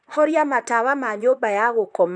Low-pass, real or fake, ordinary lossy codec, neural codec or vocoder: 9.9 kHz; fake; none; vocoder, 44.1 kHz, 128 mel bands, Pupu-Vocoder